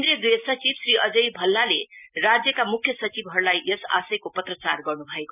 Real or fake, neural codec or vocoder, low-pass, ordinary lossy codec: real; none; 3.6 kHz; none